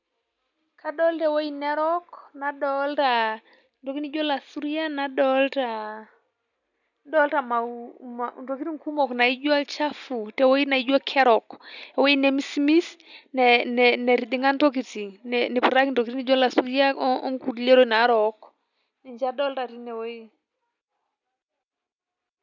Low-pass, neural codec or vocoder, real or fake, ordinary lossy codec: 7.2 kHz; none; real; none